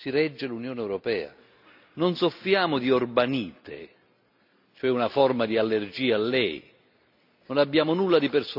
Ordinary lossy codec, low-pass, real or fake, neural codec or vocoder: none; 5.4 kHz; real; none